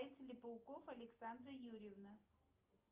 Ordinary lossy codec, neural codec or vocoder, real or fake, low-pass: Opus, 24 kbps; none; real; 3.6 kHz